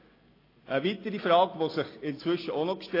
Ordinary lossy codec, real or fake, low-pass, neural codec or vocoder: AAC, 24 kbps; real; 5.4 kHz; none